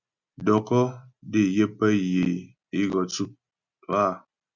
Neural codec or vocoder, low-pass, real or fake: none; 7.2 kHz; real